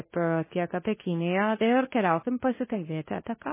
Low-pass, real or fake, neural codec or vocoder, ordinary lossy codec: 3.6 kHz; fake; codec, 24 kHz, 0.9 kbps, WavTokenizer, medium speech release version 2; MP3, 16 kbps